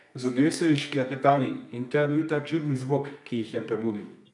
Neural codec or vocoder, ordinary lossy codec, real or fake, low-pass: codec, 24 kHz, 0.9 kbps, WavTokenizer, medium music audio release; none; fake; 10.8 kHz